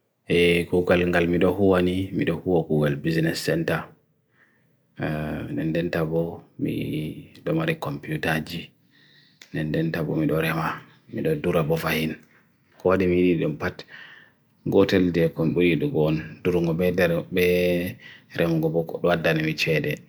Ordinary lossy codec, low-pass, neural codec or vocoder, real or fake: none; none; none; real